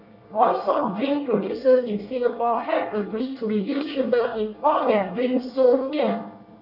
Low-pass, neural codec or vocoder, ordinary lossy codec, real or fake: 5.4 kHz; codec, 24 kHz, 1 kbps, SNAC; none; fake